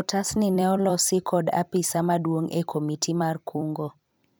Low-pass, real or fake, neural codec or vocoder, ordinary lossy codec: none; fake; vocoder, 44.1 kHz, 128 mel bands every 256 samples, BigVGAN v2; none